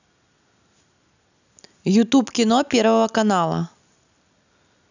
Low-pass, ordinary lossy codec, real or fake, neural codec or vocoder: 7.2 kHz; none; real; none